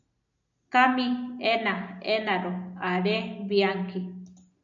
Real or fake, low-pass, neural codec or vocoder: real; 7.2 kHz; none